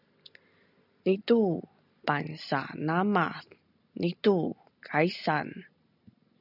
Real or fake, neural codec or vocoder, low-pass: real; none; 5.4 kHz